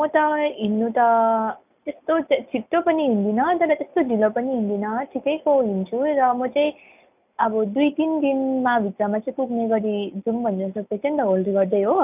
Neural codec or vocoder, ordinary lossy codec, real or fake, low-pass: none; none; real; 3.6 kHz